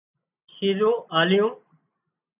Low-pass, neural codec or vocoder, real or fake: 3.6 kHz; none; real